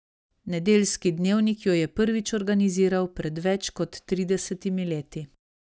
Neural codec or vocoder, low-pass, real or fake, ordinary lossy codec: none; none; real; none